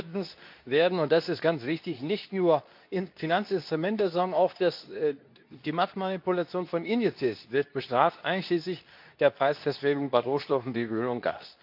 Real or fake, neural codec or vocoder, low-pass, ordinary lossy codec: fake; codec, 24 kHz, 0.9 kbps, WavTokenizer, medium speech release version 2; 5.4 kHz; none